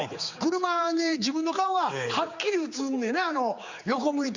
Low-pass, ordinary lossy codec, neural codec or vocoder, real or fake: 7.2 kHz; Opus, 64 kbps; codec, 16 kHz, 4 kbps, X-Codec, HuBERT features, trained on general audio; fake